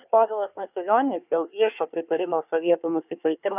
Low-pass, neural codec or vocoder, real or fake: 3.6 kHz; codec, 24 kHz, 1 kbps, SNAC; fake